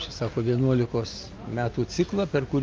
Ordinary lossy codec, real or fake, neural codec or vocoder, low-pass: Opus, 24 kbps; real; none; 7.2 kHz